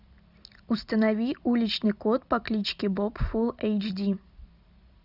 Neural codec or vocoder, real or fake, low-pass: none; real; 5.4 kHz